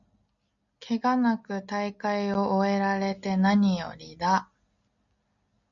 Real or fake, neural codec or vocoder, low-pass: real; none; 7.2 kHz